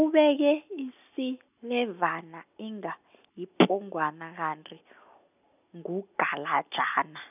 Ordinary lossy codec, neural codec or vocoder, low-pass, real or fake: none; none; 3.6 kHz; real